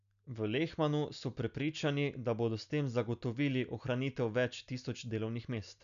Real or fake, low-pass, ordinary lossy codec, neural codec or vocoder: real; 7.2 kHz; none; none